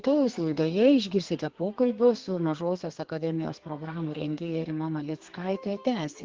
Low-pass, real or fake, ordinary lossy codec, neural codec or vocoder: 7.2 kHz; fake; Opus, 16 kbps; codec, 32 kHz, 1.9 kbps, SNAC